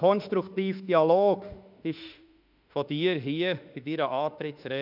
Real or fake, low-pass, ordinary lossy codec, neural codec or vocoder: fake; 5.4 kHz; none; autoencoder, 48 kHz, 32 numbers a frame, DAC-VAE, trained on Japanese speech